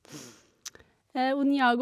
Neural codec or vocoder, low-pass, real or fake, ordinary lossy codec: none; 14.4 kHz; real; none